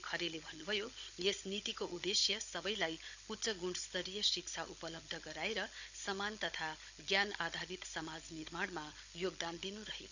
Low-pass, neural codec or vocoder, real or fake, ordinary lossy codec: 7.2 kHz; codec, 16 kHz, 16 kbps, FunCodec, trained on Chinese and English, 50 frames a second; fake; none